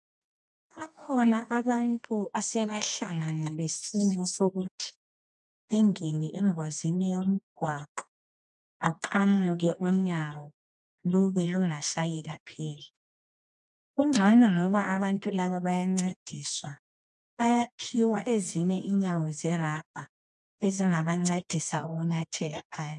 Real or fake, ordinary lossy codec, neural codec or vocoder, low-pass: fake; MP3, 96 kbps; codec, 24 kHz, 0.9 kbps, WavTokenizer, medium music audio release; 10.8 kHz